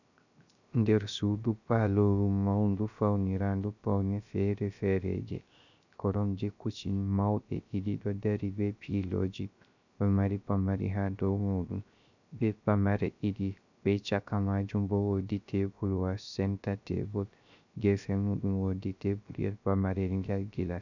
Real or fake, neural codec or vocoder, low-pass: fake; codec, 16 kHz, 0.3 kbps, FocalCodec; 7.2 kHz